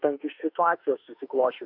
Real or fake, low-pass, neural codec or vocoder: fake; 5.4 kHz; autoencoder, 48 kHz, 32 numbers a frame, DAC-VAE, trained on Japanese speech